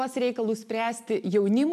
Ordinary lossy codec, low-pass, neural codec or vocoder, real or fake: MP3, 96 kbps; 14.4 kHz; none; real